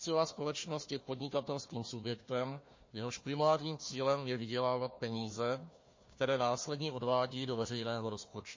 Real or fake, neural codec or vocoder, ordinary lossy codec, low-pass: fake; codec, 16 kHz, 1 kbps, FunCodec, trained on Chinese and English, 50 frames a second; MP3, 32 kbps; 7.2 kHz